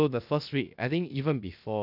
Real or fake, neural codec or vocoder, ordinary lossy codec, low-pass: fake; codec, 16 kHz, 0.7 kbps, FocalCodec; AAC, 48 kbps; 5.4 kHz